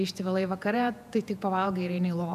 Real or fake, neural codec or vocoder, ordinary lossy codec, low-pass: fake; vocoder, 48 kHz, 128 mel bands, Vocos; AAC, 96 kbps; 14.4 kHz